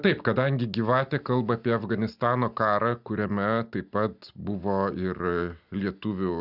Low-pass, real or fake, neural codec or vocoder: 5.4 kHz; real; none